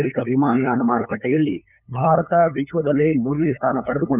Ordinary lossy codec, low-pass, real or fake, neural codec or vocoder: none; 3.6 kHz; fake; codec, 16 kHz, 8 kbps, FunCodec, trained on LibriTTS, 25 frames a second